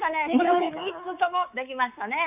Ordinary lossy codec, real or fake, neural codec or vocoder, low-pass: none; fake; codec, 24 kHz, 3.1 kbps, DualCodec; 3.6 kHz